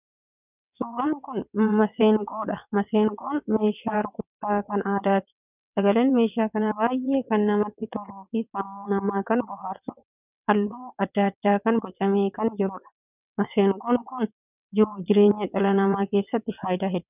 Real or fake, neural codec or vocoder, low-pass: fake; vocoder, 22.05 kHz, 80 mel bands, WaveNeXt; 3.6 kHz